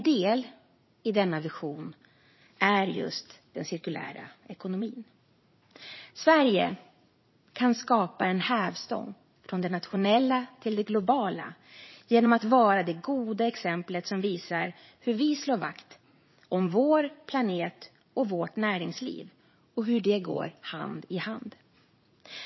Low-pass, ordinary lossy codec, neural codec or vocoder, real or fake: 7.2 kHz; MP3, 24 kbps; vocoder, 44.1 kHz, 80 mel bands, Vocos; fake